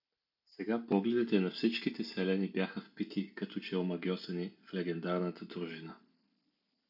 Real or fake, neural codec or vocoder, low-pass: real; none; 5.4 kHz